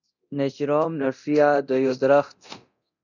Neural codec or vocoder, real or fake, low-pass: codec, 24 kHz, 0.9 kbps, DualCodec; fake; 7.2 kHz